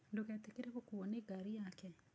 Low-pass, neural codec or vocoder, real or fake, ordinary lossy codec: none; none; real; none